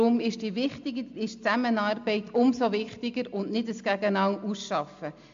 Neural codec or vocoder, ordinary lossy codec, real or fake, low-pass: none; MP3, 48 kbps; real; 7.2 kHz